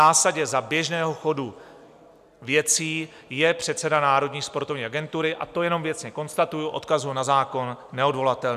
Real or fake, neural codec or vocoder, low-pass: real; none; 14.4 kHz